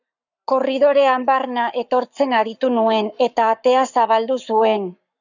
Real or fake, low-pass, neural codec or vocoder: fake; 7.2 kHz; codec, 44.1 kHz, 7.8 kbps, Pupu-Codec